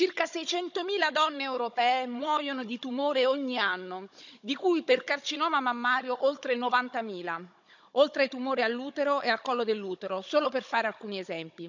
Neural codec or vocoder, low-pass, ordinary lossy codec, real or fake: codec, 16 kHz, 16 kbps, FunCodec, trained on Chinese and English, 50 frames a second; 7.2 kHz; none; fake